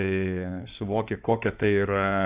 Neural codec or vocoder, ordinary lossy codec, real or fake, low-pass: codec, 16 kHz in and 24 kHz out, 2.2 kbps, FireRedTTS-2 codec; Opus, 64 kbps; fake; 3.6 kHz